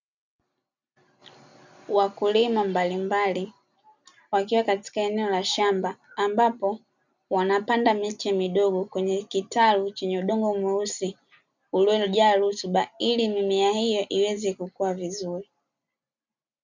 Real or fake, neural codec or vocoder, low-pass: real; none; 7.2 kHz